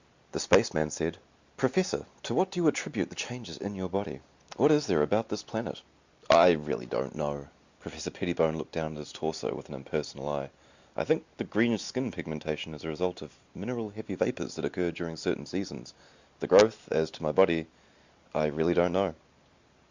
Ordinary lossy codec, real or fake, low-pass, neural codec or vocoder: Opus, 64 kbps; real; 7.2 kHz; none